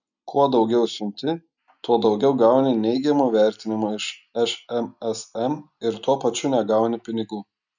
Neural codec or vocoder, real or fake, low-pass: none; real; 7.2 kHz